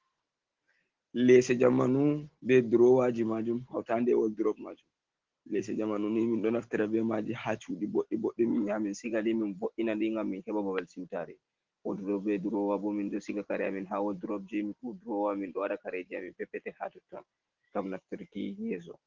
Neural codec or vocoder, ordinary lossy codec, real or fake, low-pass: none; Opus, 16 kbps; real; 7.2 kHz